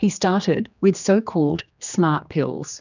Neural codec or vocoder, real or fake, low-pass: codec, 16 kHz, 2 kbps, X-Codec, HuBERT features, trained on general audio; fake; 7.2 kHz